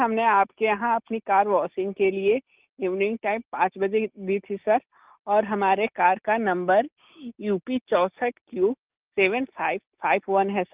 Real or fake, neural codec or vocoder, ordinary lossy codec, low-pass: real; none; Opus, 32 kbps; 3.6 kHz